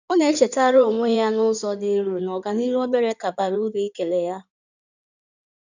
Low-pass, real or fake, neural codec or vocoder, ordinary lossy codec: 7.2 kHz; fake; codec, 16 kHz in and 24 kHz out, 2.2 kbps, FireRedTTS-2 codec; none